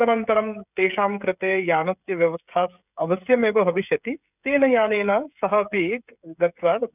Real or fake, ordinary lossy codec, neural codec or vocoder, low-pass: fake; none; codec, 16 kHz, 16 kbps, FreqCodec, smaller model; 3.6 kHz